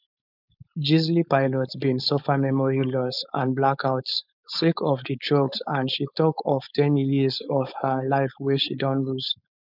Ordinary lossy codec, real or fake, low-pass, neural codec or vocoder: none; fake; 5.4 kHz; codec, 16 kHz, 4.8 kbps, FACodec